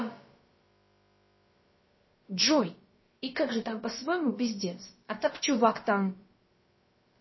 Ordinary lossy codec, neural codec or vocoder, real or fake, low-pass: MP3, 24 kbps; codec, 16 kHz, about 1 kbps, DyCAST, with the encoder's durations; fake; 7.2 kHz